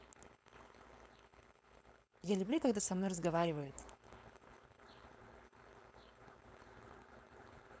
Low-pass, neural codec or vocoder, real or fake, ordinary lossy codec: none; codec, 16 kHz, 4.8 kbps, FACodec; fake; none